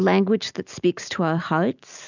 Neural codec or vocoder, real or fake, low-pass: none; real; 7.2 kHz